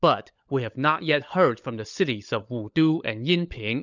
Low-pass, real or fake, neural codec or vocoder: 7.2 kHz; fake; codec, 16 kHz, 16 kbps, FunCodec, trained on LibriTTS, 50 frames a second